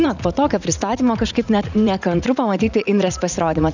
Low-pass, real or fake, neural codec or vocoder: 7.2 kHz; fake; vocoder, 44.1 kHz, 80 mel bands, Vocos